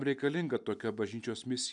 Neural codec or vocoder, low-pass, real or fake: none; 10.8 kHz; real